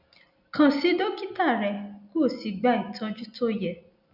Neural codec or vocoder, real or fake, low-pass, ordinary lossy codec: none; real; 5.4 kHz; none